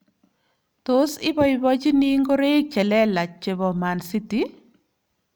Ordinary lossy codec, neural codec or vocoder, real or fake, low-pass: none; none; real; none